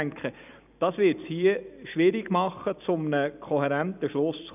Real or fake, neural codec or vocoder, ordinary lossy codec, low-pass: fake; vocoder, 44.1 kHz, 128 mel bands every 256 samples, BigVGAN v2; none; 3.6 kHz